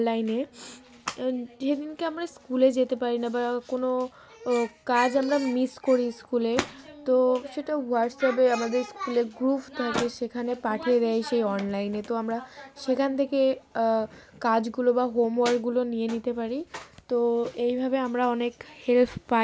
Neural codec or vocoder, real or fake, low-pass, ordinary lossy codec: none; real; none; none